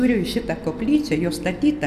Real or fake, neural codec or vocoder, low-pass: real; none; 14.4 kHz